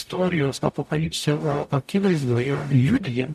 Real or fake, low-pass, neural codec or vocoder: fake; 14.4 kHz; codec, 44.1 kHz, 0.9 kbps, DAC